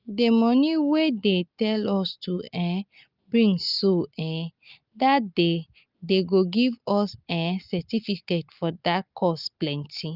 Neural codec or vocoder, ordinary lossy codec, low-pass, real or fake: none; Opus, 24 kbps; 5.4 kHz; real